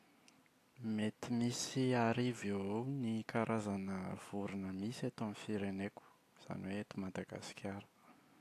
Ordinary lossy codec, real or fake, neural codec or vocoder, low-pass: AAC, 64 kbps; real; none; 14.4 kHz